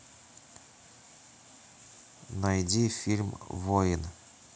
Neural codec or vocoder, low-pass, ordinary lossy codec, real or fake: none; none; none; real